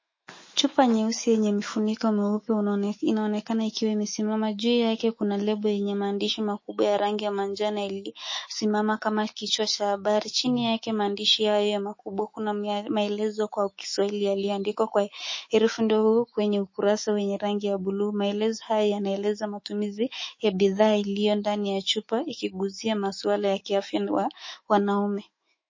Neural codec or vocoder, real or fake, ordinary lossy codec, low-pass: autoencoder, 48 kHz, 128 numbers a frame, DAC-VAE, trained on Japanese speech; fake; MP3, 32 kbps; 7.2 kHz